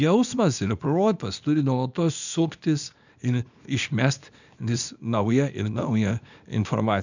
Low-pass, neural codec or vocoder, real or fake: 7.2 kHz; codec, 24 kHz, 0.9 kbps, WavTokenizer, small release; fake